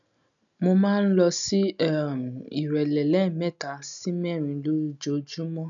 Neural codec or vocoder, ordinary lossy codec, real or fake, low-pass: none; none; real; 7.2 kHz